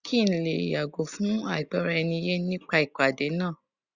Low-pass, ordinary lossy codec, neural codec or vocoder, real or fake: 7.2 kHz; Opus, 64 kbps; none; real